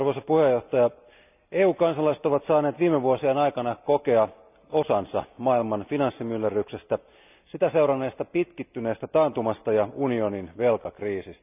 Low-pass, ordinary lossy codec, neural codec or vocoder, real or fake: 3.6 kHz; none; none; real